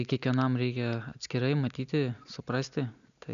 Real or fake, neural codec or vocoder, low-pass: real; none; 7.2 kHz